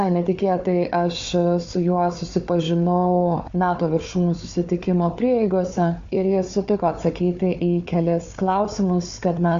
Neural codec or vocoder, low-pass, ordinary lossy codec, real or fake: codec, 16 kHz, 4 kbps, FunCodec, trained on Chinese and English, 50 frames a second; 7.2 kHz; AAC, 64 kbps; fake